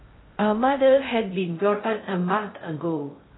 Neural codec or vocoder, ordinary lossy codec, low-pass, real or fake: codec, 16 kHz in and 24 kHz out, 0.6 kbps, FocalCodec, streaming, 2048 codes; AAC, 16 kbps; 7.2 kHz; fake